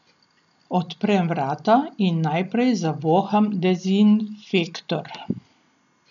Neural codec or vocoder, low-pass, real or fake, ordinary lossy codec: none; 7.2 kHz; real; none